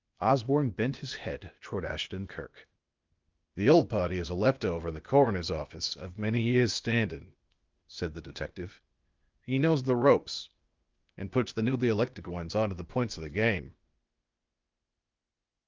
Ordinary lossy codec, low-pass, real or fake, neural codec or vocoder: Opus, 24 kbps; 7.2 kHz; fake; codec, 16 kHz, 0.8 kbps, ZipCodec